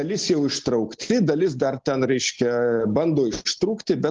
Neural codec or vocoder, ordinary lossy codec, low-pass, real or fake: none; Opus, 16 kbps; 7.2 kHz; real